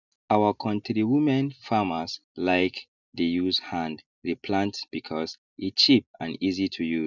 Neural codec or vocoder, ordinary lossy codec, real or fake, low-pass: none; none; real; 7.2 kHz